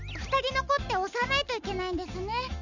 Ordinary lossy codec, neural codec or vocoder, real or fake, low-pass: none; none; real; 7.2 kHz